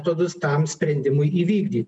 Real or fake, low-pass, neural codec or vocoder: real; 10.8 kHz; none